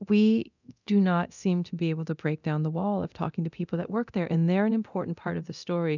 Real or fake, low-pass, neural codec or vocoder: fake; 7.2 kHz; codec, 24 kHz, 0.9 kbps, DualCodec